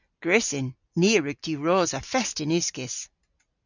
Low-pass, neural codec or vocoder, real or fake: 7.2 kHz; none; real